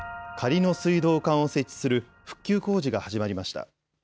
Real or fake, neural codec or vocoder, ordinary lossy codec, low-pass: real; none; none; none